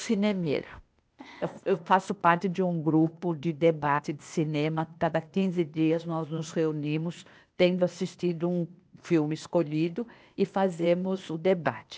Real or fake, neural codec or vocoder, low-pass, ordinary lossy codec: fake; codec, 16 kHz, 0.8 kbps, ZipCodec; none; none